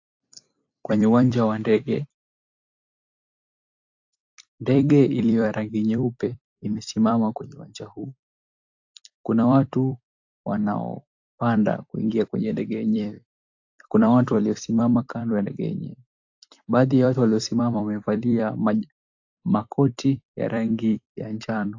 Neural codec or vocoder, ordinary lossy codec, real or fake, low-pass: vocoder, 44.1 kHz, 128 mel bands every 256 samples, BigVGAN v2; AAC, 48 kbps; fake; 7.2 kHz